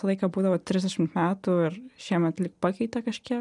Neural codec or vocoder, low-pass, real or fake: none; 10.8 kHz; real